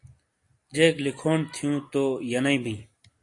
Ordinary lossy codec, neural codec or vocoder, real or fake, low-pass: AAC, 48 kbps; none; real; 10.8 kHz